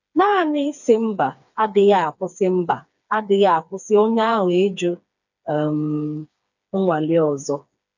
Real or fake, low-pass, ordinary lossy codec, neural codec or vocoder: fake; 7.2 kHz; none; codec, 16 kHz, 4 kbps, FreqCodec, smaller model